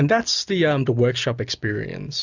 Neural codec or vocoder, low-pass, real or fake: none; 7.2 kHz; real